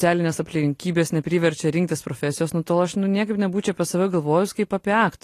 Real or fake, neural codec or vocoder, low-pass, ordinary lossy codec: real; none; 14.4 kHz; AAC, 48 kbps